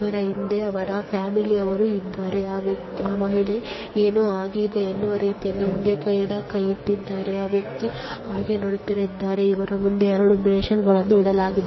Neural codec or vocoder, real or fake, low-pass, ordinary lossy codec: codec, 44.1 kHz, 2.6 kbps, SNAC; fake; 7.2 kHz; MP3, 24 kbps